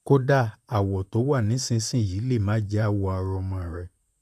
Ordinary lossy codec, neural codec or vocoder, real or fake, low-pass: none; vocoder, 44.1 kHz, 128 mel bands, Pupu-Vocoder; fake; 14.4 kHz